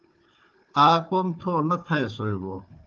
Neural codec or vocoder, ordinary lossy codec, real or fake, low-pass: codec, 16 kHz, 4 kbps, FunCodec, trained on Chinese and English, 50 frames a second; Opus, 24 kbps; fake; 7.2 kHz